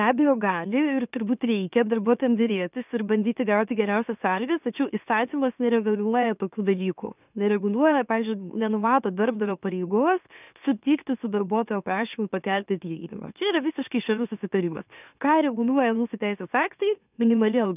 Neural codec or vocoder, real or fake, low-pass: autoencoder, 44.1 kHz, a latent of 192 numbers a frame, MeloTTS; fake; 3.6 kHz